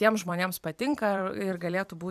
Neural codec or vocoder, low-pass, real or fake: vocoder, 44.1 kHz, 128 mel bands every 256 samples, BigVGAN v2; 14.4 kHz; fake